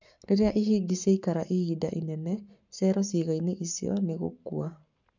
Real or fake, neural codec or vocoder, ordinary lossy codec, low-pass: fake; codec, 44.1 kHz, 7.8 kbps, Pupu-Codec; none; 7.2 kHz